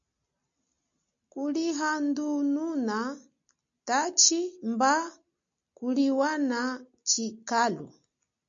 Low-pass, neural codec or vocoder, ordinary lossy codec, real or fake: 7.2 kHz; none; MP3, 64 kbps; real